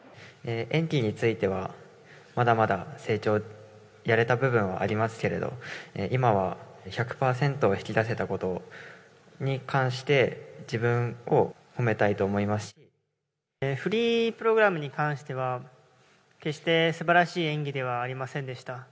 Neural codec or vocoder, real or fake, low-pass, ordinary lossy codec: none; real; none; none